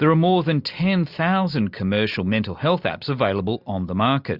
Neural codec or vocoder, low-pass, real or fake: none; 5.4 kHz; real